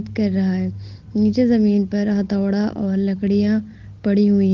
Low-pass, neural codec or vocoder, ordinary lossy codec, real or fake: 7.2 kHz; none; Opus, 16 kbps; real